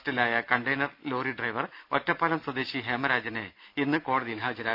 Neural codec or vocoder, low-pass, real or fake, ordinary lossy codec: none; 5.4 kHz; real; none